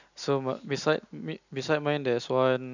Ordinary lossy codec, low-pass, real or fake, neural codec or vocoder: none; 7.2 kHz; real; none